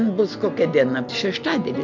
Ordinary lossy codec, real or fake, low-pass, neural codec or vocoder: MP3, 64 kbps; real; 7.2 kHz; none